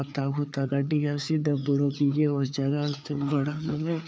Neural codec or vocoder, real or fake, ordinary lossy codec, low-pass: codec, 16 kHz, 4 kbps, FreqCodec, larger model; fake; none; none